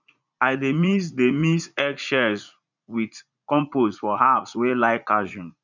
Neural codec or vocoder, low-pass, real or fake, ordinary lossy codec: vocoder, 44.1 kHz, 80 mel bands, Vocos; 7.2 kHz; fake; none